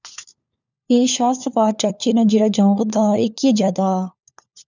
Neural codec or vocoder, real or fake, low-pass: codec, 16 kHz, 4 kbps, FunCodec, trained on LibriTTS, 50 frames a second; fake; 7.2 kHz